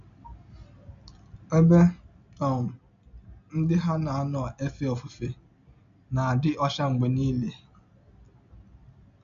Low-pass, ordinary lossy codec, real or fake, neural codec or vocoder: 7.2 kHz; MP3, 64 kbps; real; none